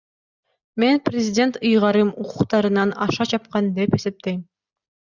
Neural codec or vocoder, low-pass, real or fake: none; 7.2 kHz; real